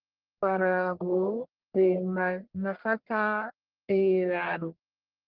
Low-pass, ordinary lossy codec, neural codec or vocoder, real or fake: 5.4 kHz; Opus, 16 kbps; codec, 44.1 kHz, 1.7 kbps, Pupu-Codec; fake